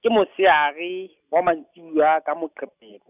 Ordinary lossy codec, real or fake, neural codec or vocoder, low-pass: none; real; none; 3.6 kHz